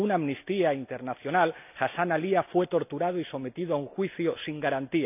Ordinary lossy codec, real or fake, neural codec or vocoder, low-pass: none; real; none; 3.6 kHz